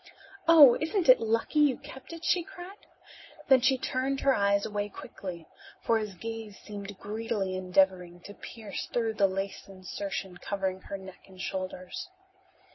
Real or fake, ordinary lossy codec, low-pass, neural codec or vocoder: real; MP3, 24 kbps; 7.2 kHz; none